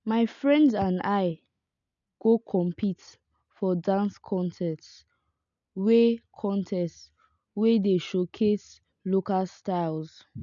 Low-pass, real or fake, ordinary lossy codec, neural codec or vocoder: 7.2 kHz; real; none; none